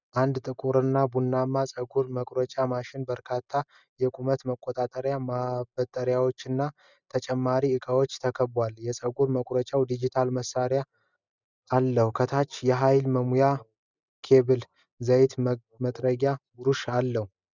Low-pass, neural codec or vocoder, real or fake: 7.2 kHz; none; real